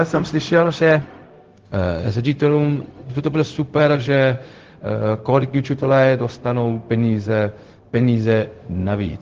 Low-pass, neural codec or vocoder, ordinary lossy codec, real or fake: 7.2 kHz; codec, 16 kHz, 0.4 kbps, LongCat-Audio-Codec; Opus, 16 kbps; fake